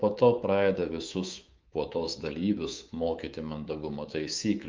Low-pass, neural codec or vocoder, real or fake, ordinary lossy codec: 7.2 kHz; codec, 24 kHz, 3.1 kbps, DualCodec; fake; Opus, 32 kbps